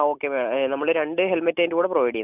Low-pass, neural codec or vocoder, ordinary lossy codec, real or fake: 3.6 kHz; none; none; real